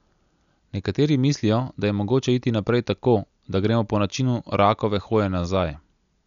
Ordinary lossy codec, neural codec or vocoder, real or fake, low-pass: none; none; real; 7.2 kHz